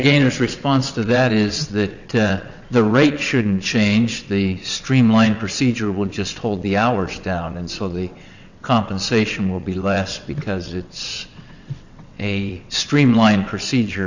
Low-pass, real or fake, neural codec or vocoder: 7.2 kHz; fake; vocoder, 22.05 kHz, 80 mel bands, WaveNeXt